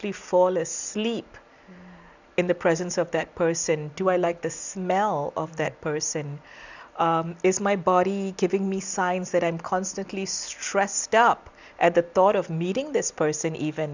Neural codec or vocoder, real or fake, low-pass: none; real; 7.2 kHz